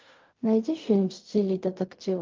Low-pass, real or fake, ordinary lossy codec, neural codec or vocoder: 7.2 kHz; fake; Opus, 32 kbps; codec, 16 kHz in and 24 kHz out, 0.4 kbps, LongCat-Audio-Codec, fine tuned four codebook decoder